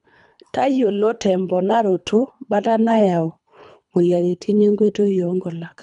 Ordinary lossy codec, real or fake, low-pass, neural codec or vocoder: none; fake; 10.8 kHz; codec, 24 kHz, 3 kbps, HILCodec